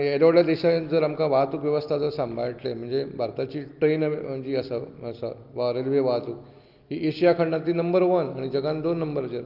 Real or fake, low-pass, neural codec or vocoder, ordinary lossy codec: real; 5.4 kHz; none; Opus, 32 kbps